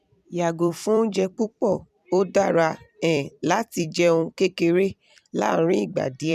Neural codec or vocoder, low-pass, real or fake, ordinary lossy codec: vocoder, 44.1 kHz, 128 mel bands every 256 samples, BigVGAN v2; 14.4 kHz; fake; none